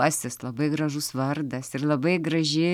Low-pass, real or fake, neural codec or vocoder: 19.8 kHz; real; none